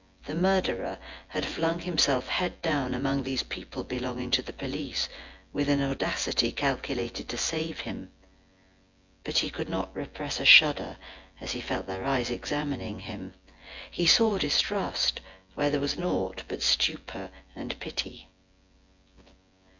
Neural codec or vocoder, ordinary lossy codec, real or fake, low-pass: vocoder, 24 kHz, 100 mel bands, Vocos; MP3, 64 kbps; fake; 7.2 kHz